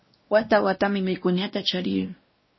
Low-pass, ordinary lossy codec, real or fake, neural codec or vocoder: 7.2 kHz; MP3, 24 kbps; fake; codec, 16 kHz, 1 kbps, X-Codec, WavLM features, trained on Multilingual LibriSpeech